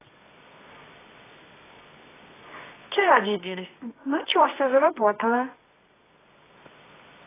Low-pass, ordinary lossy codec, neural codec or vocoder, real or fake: 3.6 kHz; AAC, 16 kbps; codec, 24 kHz, 0.9 kbps, WavTokenizer, medium music audio release; fake